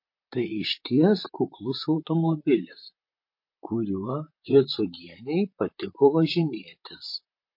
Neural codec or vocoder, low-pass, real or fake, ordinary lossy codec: vocoder, 44.1 kHz, 128 mel bands, Pupu-Vocoder; 5.4 kHz; fake; MP3, 32 kbps